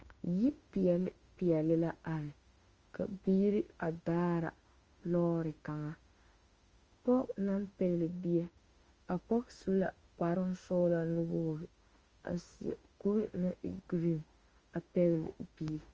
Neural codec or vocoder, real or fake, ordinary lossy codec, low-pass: autoencoder, 48 kHz, 32 numbers a frame, DAC-VAE, trained on Japanese speech; fake; Opus, 24 kbps; 7.2 kHz